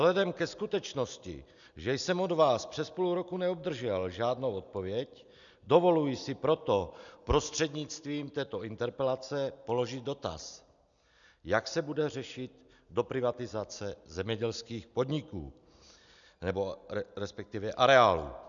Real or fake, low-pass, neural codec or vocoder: real; 7.2 kHz; none